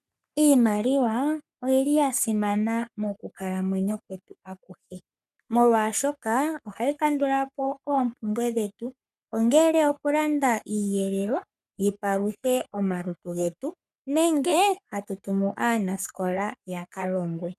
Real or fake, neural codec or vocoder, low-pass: fake; codec, 44.1 kHz, 3.4 kbps, Pupu-Codec; 14.4 kHz